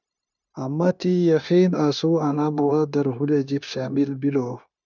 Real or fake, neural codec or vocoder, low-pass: fake; codec, 16 kHz, 0.9 kbps, LongCat-Audio-Codec; 7.2 kHz